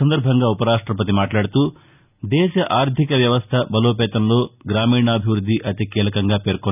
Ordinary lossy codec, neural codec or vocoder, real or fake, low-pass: none; none; real; 3.6 kHz